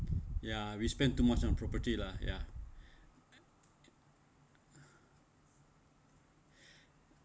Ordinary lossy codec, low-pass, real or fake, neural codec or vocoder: none; none; real; none